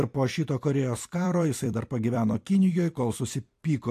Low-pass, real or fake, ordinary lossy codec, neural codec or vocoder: 14.4 kHz; fake; AAC, 64 kbps; vocoder, 44.1 kHz, 128 mel bands every 256 samples, BigVGAN v2